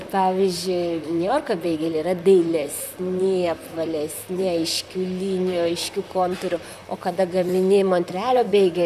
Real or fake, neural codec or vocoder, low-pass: fake; vocoder, 44.1 kHz, 128 mel bands, Pupu-Vocoder; 14.4 kHz